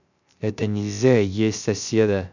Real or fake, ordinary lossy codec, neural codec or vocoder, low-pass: fake; none; codec, 16 kHz, 0.3 kbps, FocalCodec; 7.2 kHz